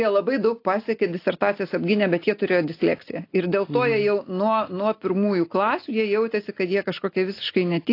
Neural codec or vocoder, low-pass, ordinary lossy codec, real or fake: none; 5.4 kHz; AAC, 32 kbps; real